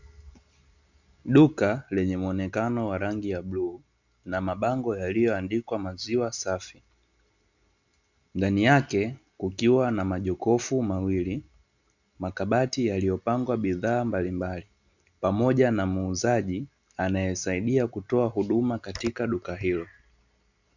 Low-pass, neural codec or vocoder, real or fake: 7.2 kHz; none; real